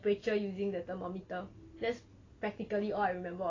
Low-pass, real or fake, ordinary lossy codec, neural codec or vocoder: 7.2 kHz; real; AAC, 32 kbps; none